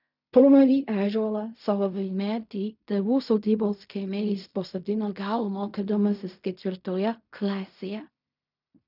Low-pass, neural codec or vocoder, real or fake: 5.4 kHz; codec, 16 kHz in and 24 kHz out, 0.4 kbps, LongCat-Audio-Codec, fine tuned four codebook decoder; fake